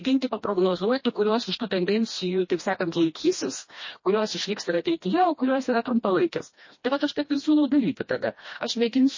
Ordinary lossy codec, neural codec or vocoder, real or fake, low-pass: MP3, 32 kbps; codec, 16 kHz, 1 kbps, FreqCodec, smaller model; fake; 7.2 kHz